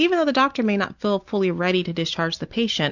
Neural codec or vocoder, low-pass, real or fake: none; 7.2 kHz; real